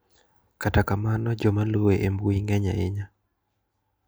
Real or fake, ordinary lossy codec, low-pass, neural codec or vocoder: real; none; none; none